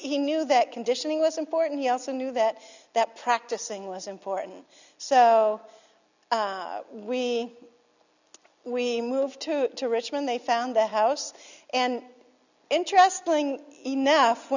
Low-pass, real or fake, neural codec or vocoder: 7.2 kHz; real; none